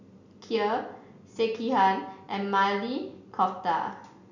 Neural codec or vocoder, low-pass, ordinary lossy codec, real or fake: none; 7.2 kHz; none; real